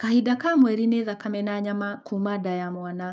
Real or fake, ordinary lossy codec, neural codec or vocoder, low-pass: fake; none; codec, 16 kHz, 6 kbps, DAC; none